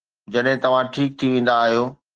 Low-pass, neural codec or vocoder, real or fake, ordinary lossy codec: 9.9 kHz; none; real; Opus, 16 kbps